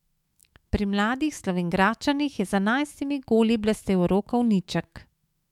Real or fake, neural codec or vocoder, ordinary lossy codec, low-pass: fake; autoencoder, 48 kHz, 128 numbers a frame, DAC-VAE, trained on Japanese speech; MP3, 96 kbps; 19.8 kHz